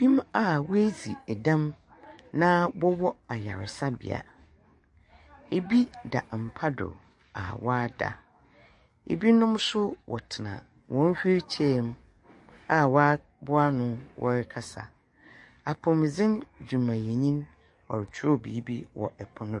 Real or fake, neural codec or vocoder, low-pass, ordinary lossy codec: fake; codec, 44.1 kHz, 7.8 kbps, DAC; 10.8 kHz; MP3, 48 kbps